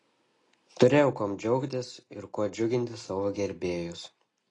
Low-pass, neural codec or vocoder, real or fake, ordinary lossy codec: 10.8 kHz; none; real; AAC, 32 kbps